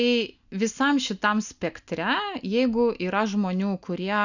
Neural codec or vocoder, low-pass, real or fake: none; 7.2 kHz; real